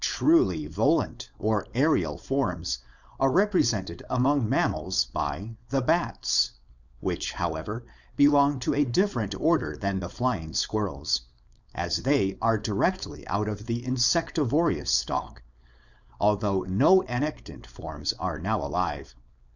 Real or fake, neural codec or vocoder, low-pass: fake; codec, 16 kHz, 4.8 kbps, FACodec; 7.2 kHz